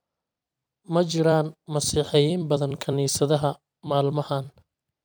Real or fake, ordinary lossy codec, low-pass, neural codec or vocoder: fake; none; none; vocoder, 44.1 kHz, 128 mel bands every 256 samples, BigVGAN v2